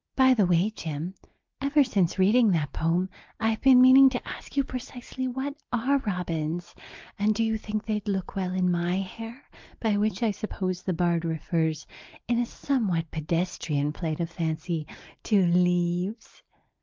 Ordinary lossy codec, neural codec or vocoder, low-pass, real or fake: Opus, 32 kbps; none; 7.2 kHz; real